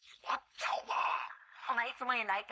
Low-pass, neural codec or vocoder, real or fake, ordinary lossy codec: none; codec, 16 kHz, 4.8 kbps, FACodec; fake; none